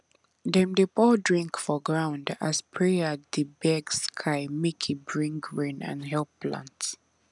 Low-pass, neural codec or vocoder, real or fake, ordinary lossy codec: 10.8 kHz; none; real; none